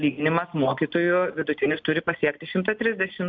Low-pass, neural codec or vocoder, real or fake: 7.2 kHz; none; real